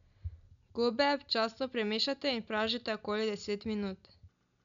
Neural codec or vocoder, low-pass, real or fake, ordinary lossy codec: none; 7.2 kHz; real; MP3, 96 kbps